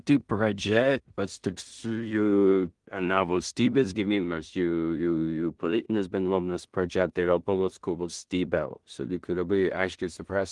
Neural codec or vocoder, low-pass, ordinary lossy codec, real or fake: codec, 16 kHz in and 24 kHz out, 0.4 kbps, LongCat-Audio-Codec, two codebook decoder; 10.8 kHz; Opus, 24 kbps; fake